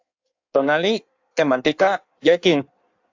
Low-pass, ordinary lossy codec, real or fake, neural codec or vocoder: 7.2 kHz; AAC, 48 kbps; fake; codec, 16 kHz in and 24 kHz out, 1.1 kbps, FireRedTTS-2 codec